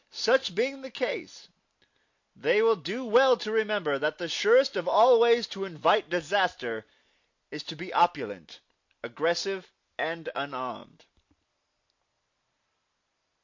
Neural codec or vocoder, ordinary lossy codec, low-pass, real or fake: none; MP3, 48 kbps; 7.2 kHz; real